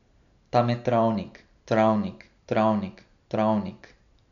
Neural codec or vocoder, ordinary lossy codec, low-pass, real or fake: none; none; 7.2 kHz; real